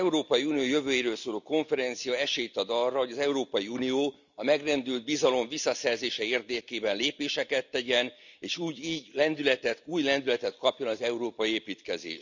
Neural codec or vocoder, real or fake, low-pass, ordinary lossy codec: none; real; 7.2 kHz; none